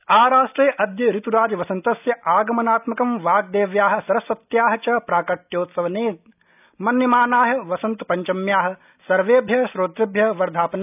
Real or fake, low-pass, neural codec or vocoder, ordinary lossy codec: real; 3.6 kHz; none; none